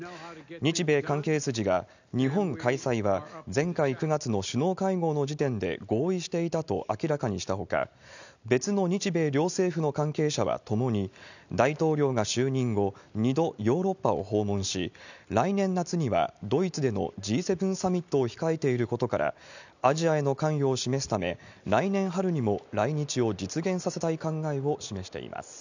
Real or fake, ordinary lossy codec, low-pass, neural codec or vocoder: real; none; 7.2 kHz; none